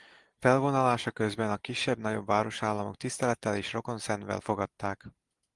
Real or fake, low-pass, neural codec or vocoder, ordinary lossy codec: real; 10.8 kHz; none; Opus, 24 kbps